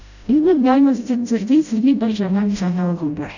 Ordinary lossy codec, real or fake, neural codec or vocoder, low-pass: none; fake; codec, 16 kHz, 0.5 kbps, FreqCodec, smaller model; 7.2 kHz